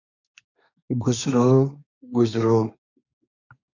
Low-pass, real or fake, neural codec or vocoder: 7.2 kHz; fake; codec, 24 kHz, 1 kbps, SNAC